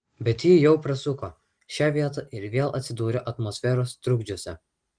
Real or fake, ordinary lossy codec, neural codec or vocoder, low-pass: real; Opus, 24 kbps; none; 9.9 kHz